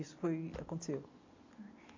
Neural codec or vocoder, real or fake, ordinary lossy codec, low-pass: none; real; AAC, 48 kbps; 7.2 kHz